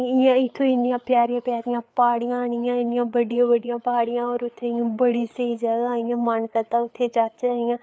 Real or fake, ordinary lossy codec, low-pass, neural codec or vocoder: fake; none; none; codec, 16 kHz, 4 kbps, FreqCodec, larger model